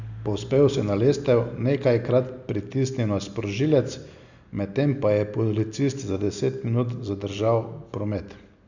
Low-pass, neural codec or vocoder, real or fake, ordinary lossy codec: 7.2 kHz; none; real; none